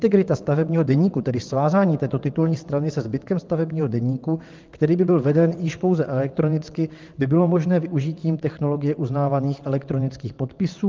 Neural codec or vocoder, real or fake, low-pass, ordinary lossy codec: vocoder, 22.05 kHz, 80 mel bands, WaveNeXt; fake; 7.2 kHz; Opus, 32 kbps